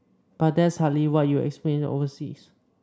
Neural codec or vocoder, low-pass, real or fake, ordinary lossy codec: none; none; real; none